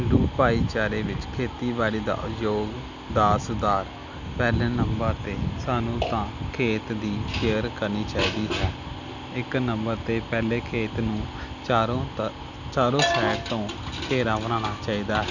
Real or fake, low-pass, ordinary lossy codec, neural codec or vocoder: real; 7.2 kHz; none; none